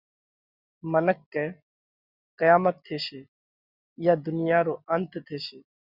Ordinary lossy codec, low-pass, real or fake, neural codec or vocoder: Opus, 64 kbps; 5.4 kHz; real; none